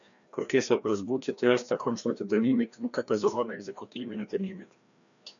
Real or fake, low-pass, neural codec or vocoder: fake; 7.2 kHz; codec, 16 kHz, 1 kbps, FreqCodec, larger model